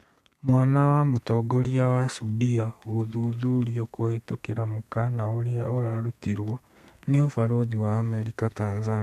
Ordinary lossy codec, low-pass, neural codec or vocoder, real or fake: MP3, 96 kbps; 14.4 kHz; codec, 32 kHz, 1.9 kbps, SNAC; fake